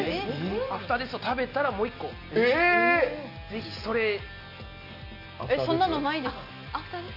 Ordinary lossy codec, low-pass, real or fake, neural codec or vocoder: AAC, 48 kbps; 5.4 kHz; real; none